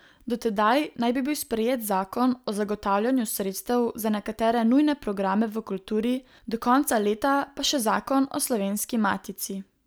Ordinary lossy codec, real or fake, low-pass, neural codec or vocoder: none; real; none; none